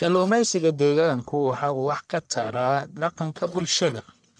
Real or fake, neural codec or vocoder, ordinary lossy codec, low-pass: fake; codec, 44.1 kHz, 1.7 kbps, Pupu-Codec; MP3, 96 kbps; 9.9 kHz